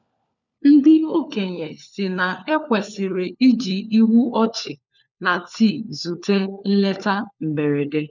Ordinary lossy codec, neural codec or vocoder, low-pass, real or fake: none; codec, 16 kHz, 4 kbps, FunCodec, trained on LibriTTS, 50 frames a second; 7.2 kHz; fake